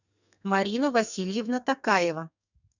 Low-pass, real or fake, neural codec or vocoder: 7.2 kHz; fake; codec, 32 kHz, 1.9 kbps, SNAC